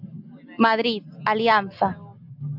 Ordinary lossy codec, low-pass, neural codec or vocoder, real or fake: AAC, 48 kbps; 5.4 kHz; none; real